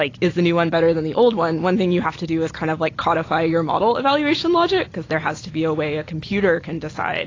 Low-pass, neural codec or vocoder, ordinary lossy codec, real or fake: 7.2 kHz; none; AAC, 32 kbps; real